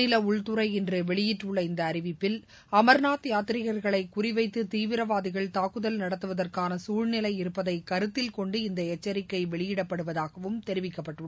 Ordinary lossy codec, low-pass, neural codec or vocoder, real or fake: none; none; none; real